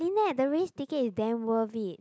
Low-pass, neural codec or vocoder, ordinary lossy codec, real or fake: none; none; none; real